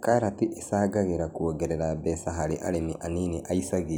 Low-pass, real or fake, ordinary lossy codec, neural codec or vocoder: none; real; none; none